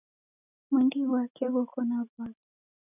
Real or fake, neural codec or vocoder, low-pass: real; none; 3.6 kHz